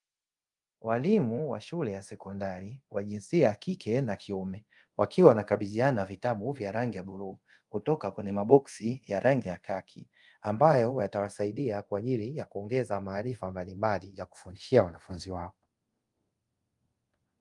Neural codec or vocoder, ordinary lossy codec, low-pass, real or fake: codec, 24 kHz, 0.5 kbps, DualCodec; Opus, 32 kbps; 10.8 kHz; fake